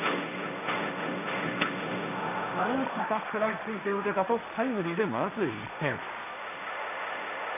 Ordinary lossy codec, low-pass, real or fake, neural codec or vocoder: none; 3.6 kHz; fake; codec, 16 kHz, 1.1 kbps, Voila-Tokenizer